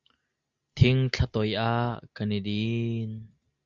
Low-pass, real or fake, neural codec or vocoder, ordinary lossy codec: 7.2 kHz; real; none; Opus, 64 kbps